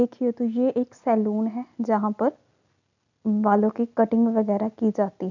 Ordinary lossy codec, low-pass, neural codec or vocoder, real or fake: none; 7.2 kHz; none; real